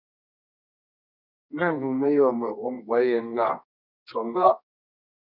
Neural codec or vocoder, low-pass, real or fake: codec, 24 kHz, 0.9 kbps, WavTokenizer, medium music audio release; 5.4 kHz; fake